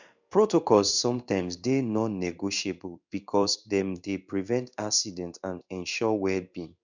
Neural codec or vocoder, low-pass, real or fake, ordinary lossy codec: codec, 16 kHz in and 24 kHz out, 1 kbps, XY-Tokenizer; 7.2 kHz; fake; none